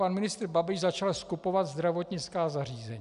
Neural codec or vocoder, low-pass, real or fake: none; 10.8 kHz; real